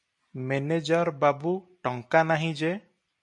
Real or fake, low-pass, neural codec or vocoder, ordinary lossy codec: real; 10.8 kHz; none; MP3, 96 kbps